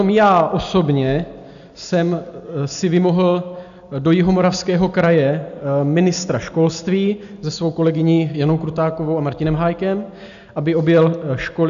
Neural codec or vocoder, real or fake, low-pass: none; real; 7.2 kHz